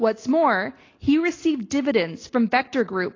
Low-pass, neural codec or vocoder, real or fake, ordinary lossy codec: 7.2 kHz; none; real; AAC, 32 kbps